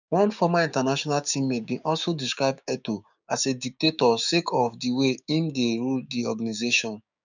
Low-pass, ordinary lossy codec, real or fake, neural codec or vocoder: 7.2 kHz; none; fake; codec, 16 kHz, 6 kbps, DAC